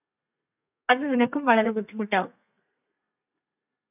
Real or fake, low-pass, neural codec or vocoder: fake; 3.6 kHz; codec, 32 kHz, 1.9 kbps, SNAC